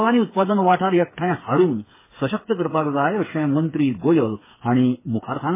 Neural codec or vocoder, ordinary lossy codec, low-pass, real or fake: codec, 16 kHz, 4 kbps, FreqCodec, smaller model; MP3, 16 kbps; 3.6 kHz; fake